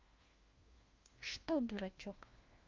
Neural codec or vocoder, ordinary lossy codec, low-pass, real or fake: codec, 16 kHz, 1 kbps, FunCodec, trained on LibriTTS, 50 frames a second; Opus, 24 kbps; 7.2 kHz; fake